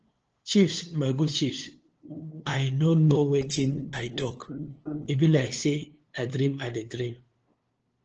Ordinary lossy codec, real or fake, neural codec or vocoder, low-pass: Opus, 16 kbps; fake; codec, 16 kHz, 2 kbps, FunCodec, trained on LibriTTS, 25 frames a second; 7.2 kHz